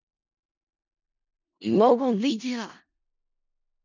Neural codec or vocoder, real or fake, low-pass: codec, 16 kHz in and 24 kHz out, 0.4 kbps, LongCat-Audio-Codec, four codebook decoder; fake; 7.2 kHz